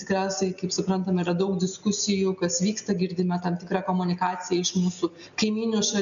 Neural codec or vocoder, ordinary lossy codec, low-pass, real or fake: none; MP3, 96 kbps; 7.2 kHz; real